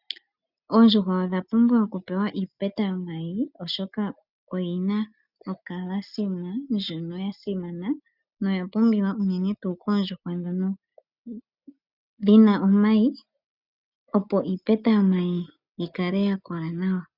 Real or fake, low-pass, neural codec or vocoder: real; 5.4 kHz; none